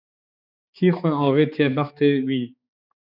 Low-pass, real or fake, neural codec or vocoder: 5.4 kHz; fake; codec, 16 kHz, 4 kbps, X-Codec, HuBERT features, trained on balanced general audio